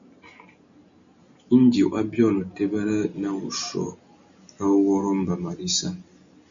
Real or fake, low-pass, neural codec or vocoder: real; 7.2 kHz; none